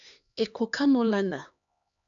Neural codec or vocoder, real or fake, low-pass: codec, 16 kHz, 2 kbps, X-Codec, HuBERT features, trained on LibriSpeech; fake; 7.2 kHz